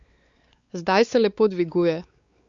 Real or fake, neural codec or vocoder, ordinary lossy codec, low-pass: fake; codec, 16 kHz, 4 kbps, X-Codec, WavLM features, trained on Multilingual LibriSpeech; Opus, 64 kbps; 7.2 kHz